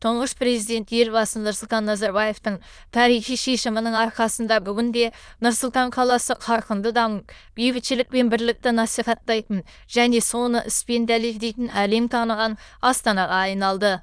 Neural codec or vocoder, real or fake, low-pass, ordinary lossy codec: autoencoder, 22.05 kHz, a latent of 192 numbers a frame, VITS, trained on many speakers; fake; none; none